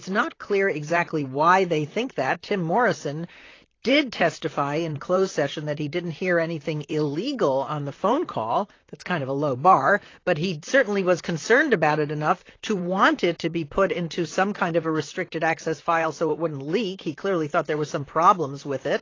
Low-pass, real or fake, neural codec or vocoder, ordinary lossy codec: 7.2 kHz; fake; vocoder, 44.1 kHz, 128 mel bands, Pupu-Vocoder; AAC, 32 kbps